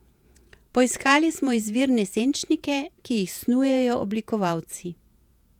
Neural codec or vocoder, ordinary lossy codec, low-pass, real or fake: vocoder, 48 kHz, 128 mel bands, Vocos; none; 19.8 kHz; fake